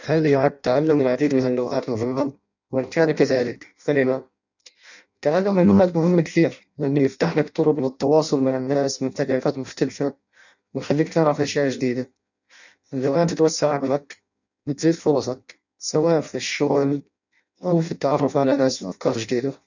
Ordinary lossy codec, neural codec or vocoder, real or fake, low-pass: none; codec, 16 kHz in and 24 kHz out, 0.6 kbps, FireRedTTS-2 codec; fake; 7.2 kHz